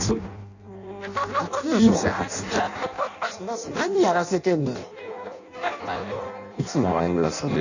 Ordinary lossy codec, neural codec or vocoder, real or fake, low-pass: none; codec, 16 kHz in and 24 kHz out, 0.6 kbps, FireRedTTS-2 codec; fake; 7.2 kHz